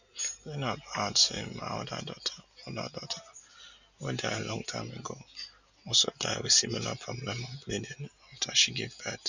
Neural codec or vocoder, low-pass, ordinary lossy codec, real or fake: none; 7.2 kHz; none; real